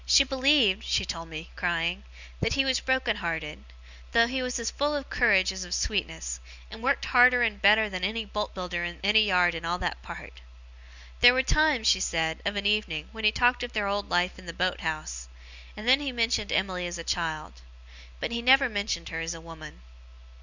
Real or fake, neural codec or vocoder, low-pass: real; none; 7.2 kHz